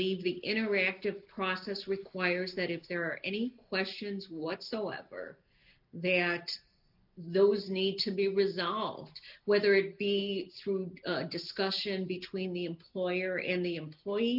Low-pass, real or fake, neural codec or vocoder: 5.4 kHz; real; none